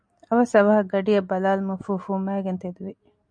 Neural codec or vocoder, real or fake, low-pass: none; real; 9.9 kHz